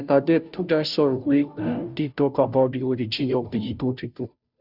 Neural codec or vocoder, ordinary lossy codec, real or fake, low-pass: codec, 16 kHz, 0.5 kbps, FunCodec, trained on Chinese and English, 25 frames a second; none; fake; 5.4 kHz